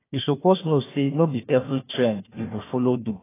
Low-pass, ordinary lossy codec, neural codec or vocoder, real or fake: 3.6 kHz; AAC, 16 kbps; codec, 16 kHz, 1 kbps, FunCodec, trained on Chinese and English, 50 frames a second; fake